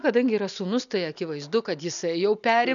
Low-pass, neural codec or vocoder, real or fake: 7.2 kHz; none; real